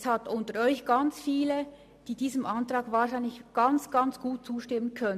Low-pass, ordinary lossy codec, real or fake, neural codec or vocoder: 14.4 kHz; MP3, 96 kbps; real; none